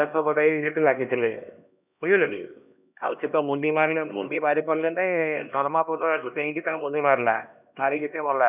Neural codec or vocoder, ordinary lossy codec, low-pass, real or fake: codec, 16 kHz, 1 kbps, X-Codec, HuBERT features, trained on LibriSpeech; none; 3.6 kHz; fake